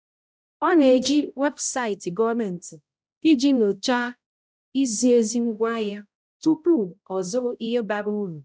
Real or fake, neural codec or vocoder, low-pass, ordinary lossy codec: fake; codec, 16 kHz, 0.5 kbps, X-Codec, HuBERT features, trained on balanced general audio; none; none